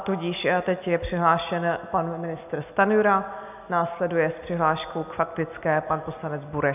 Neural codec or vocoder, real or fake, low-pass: none; real; 3.6 kHz